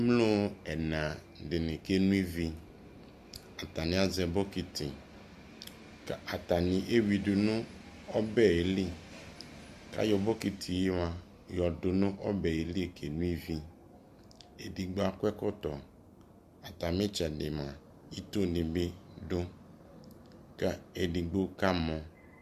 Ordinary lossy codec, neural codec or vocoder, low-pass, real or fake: Opus, 64 kbps; none; 14.4 kHz; real